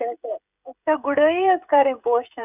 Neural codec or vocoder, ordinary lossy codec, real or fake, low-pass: codec, 16 kHz, 16 kbps, FreqCodec, smaller model; none; fake; 3.6 kHz